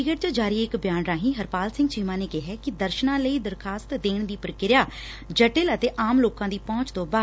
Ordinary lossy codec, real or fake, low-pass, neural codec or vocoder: none; real; none; none